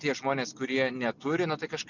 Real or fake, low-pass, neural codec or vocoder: real; 7.2 kHz; none